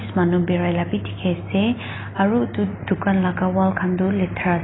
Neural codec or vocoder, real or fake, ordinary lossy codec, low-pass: none; real; AAC, 16 kbps; 7.2 kHz